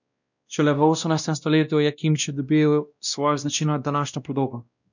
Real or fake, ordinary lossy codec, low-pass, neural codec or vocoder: fake; none; 7.2 kHz; codec, 16 kHz, 1 kbps, X-Codec, WavLM features, trained on Multilingual LibriSpeech